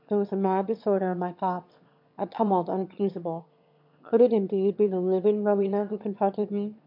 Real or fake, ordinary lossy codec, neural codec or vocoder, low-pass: fake; MP3, 48 kbps; autoencoder, 22.05 kHz, a latent of 192 numbers a frame, VITS, trained on one speaker; 5.4 kHz